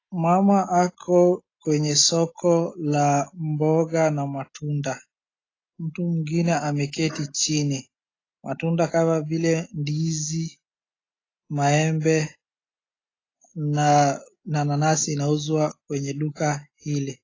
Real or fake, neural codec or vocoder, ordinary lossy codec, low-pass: real; none; AAC, 32 kbps; 7.2 kHz